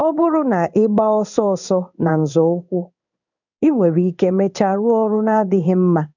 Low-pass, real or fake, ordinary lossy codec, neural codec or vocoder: 7.2 kHz; fake; none; codec, 16 kHz in and 24 kHz out, 1 kbps, XY-Tokenizer